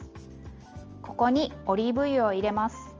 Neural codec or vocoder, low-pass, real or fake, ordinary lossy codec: none; 7.2 kHz; real; Opus, 24 kbps